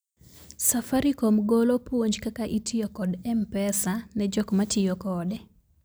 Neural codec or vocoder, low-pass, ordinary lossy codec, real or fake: none; none; none; real